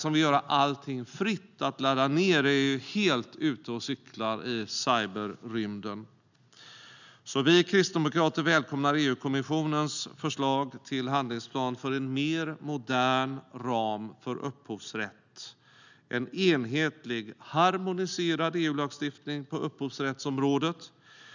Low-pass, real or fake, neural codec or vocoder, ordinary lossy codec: 7.2 kHz; real; none; none